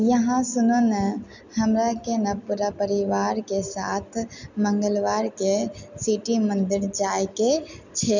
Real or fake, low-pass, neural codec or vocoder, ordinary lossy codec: real; 7.2 kHz; none; none